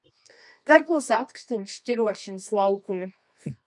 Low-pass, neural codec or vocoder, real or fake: 10.8 kHz; codec, 24 kHz, 0.9 kbps, WavTokenizer, medium music audio release; fake